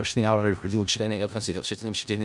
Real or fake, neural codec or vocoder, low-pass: fake; codec, 16 kHz in and 24 kHz out, 0.4 kbps, LongCat-Audio-Codec, four codebook decoder; 10.8 kHz